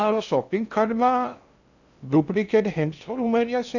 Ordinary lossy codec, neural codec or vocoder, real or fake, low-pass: none; codec, 16 kHz in and 24 kHz out, 0.6 kbps, FocalCodec, streaming, 2048 codes; fake; 7.2 kHz